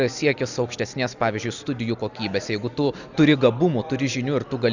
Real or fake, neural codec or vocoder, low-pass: real; none; 7.2 kHz